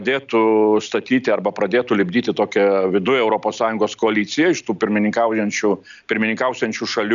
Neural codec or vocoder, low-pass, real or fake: none; 7.2 kHz; real